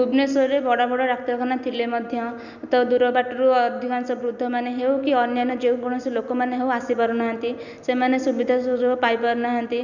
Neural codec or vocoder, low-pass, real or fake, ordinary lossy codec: none; 7.2 kHz; real; none